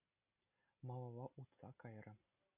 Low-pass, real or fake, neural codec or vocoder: 3.6 kHz; real; none